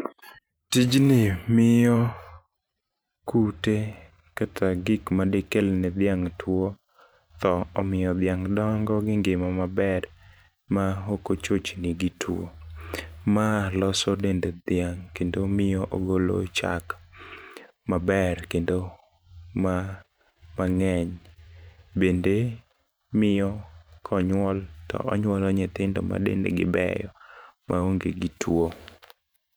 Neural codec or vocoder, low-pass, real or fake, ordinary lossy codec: vocoder, 44.1 kHz, 128 mel bands every 512 samples, BigVGAN v2; none; fake; none